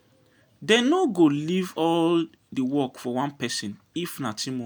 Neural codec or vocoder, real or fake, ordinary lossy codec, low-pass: none; real; none; none